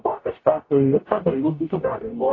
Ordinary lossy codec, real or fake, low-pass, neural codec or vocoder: AAC, 32 kbps; fake; 7.2 kHz; codec, 44.1 kHz, 0.9 kbps, DAC